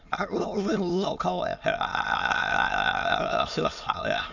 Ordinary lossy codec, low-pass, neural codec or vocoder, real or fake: none; 7.2 kHz; autoencoder, 22.05 kHz, a latent of 192 numbers a frame, VITS, trained on many speakers; fake